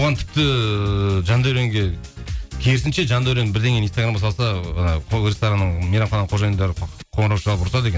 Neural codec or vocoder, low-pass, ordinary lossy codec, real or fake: none; none; none; real